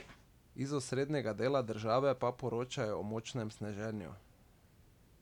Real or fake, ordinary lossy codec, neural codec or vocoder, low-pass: real; none; none; 19.8 kHz